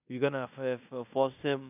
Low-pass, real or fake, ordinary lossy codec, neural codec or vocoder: 3.6 kHz; fake; AAC, 32 kbps; codec, 16 kHz in and 24 kHz out, 0.9 kbps, LongCat-Audio-Codec, fine tuned four codebook decoder